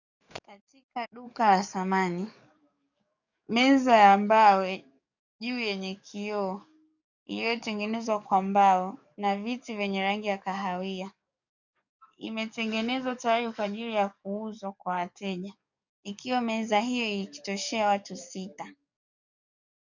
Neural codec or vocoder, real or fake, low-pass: codec, 44.1 kHz, 7.8 kbps, DAC; fake; 7.2 kHz